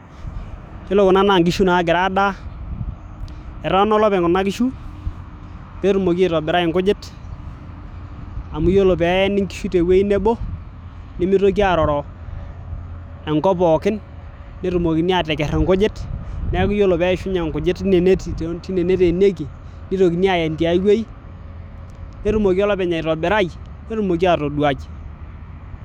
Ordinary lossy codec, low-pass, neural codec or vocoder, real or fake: none; 19.8 kHz; autoencoder, 48 kHz, 128 numbers a frame, DAC-VAE, trained on Japanese speech; fake